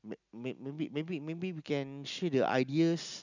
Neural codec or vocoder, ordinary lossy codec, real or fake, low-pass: none; none; real; 7.2 kHz